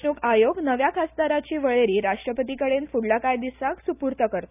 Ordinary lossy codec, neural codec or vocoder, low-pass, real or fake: none; none; 3.6 kHz; real